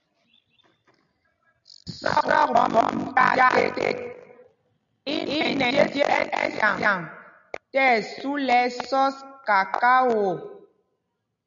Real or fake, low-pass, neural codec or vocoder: real; 7.2 kHz; none